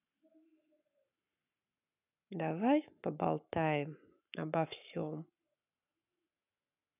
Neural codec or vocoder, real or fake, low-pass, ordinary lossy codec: codec, 44.1 kHz, 7.8 kbps, Pupu-Codec; fake; 3.6 kHz; none